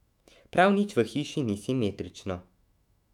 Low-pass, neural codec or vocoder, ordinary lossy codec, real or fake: 19.8 kHz; autoencoder, 48 kHz, 128 numbers a frame, DAC-VAE, trained on Japanese speech; none; fake